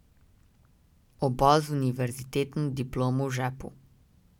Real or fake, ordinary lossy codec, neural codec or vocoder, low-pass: real; none; none; 19.8 kHz